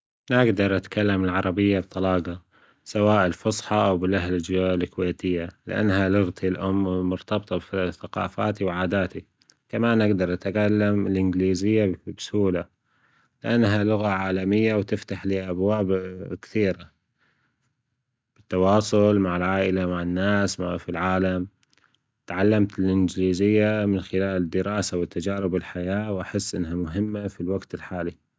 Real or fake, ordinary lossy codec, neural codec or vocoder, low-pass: real; none; none; none